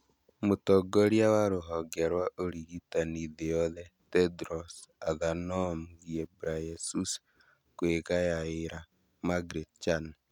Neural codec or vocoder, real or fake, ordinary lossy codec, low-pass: none; real; none; 19.8 kHz